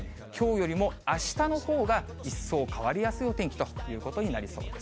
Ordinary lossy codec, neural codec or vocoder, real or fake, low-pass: none; none; real; none